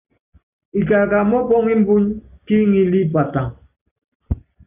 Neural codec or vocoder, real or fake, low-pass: none; real; 3.6 kHz